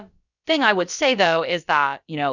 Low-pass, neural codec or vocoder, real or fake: 7.2 kHz; codec, 16 kHz, about 1 kbps, DyCAST, with the encoder's durations; fake